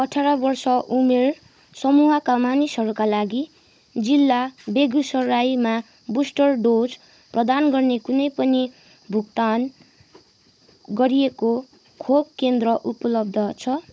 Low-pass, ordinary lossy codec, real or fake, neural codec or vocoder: none; none; fake; codec, 16 kHz, 16 kbps, FunCodec, trained on Chinese and English, 50 frames a second